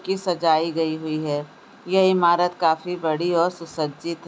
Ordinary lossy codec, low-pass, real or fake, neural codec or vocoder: none; none; real; none